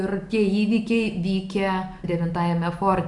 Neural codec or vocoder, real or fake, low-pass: none; real; 10.8 kHz